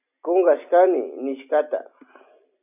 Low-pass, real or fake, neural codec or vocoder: 3.6 kHz; real; none